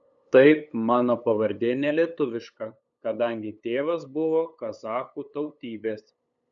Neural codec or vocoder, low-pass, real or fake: codec, 16 kHz, 8 kbps, FunCodec, trained on LibriTTS, 25 frames a second; 7.2 kHz; fake